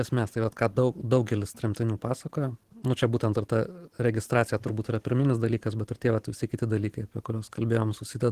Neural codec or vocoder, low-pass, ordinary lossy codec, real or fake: vocoder, 44.1 kHz, 128 mel bands every 512 samples, BigVGAN v2; 14.4 kHz; Opus, 16 kbps; fake